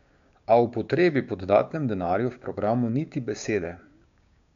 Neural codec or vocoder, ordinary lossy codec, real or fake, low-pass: codec, 16 kHz, 6 kbps, DAC; MP3, 64 kbps; fake; 7.2 kHz